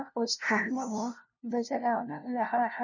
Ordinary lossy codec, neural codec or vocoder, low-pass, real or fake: none; codec, 16 kHz, 0.5 kbps, FunCodec, trained on LibriTTS, 25 frames a second; 7.2 kHz; fake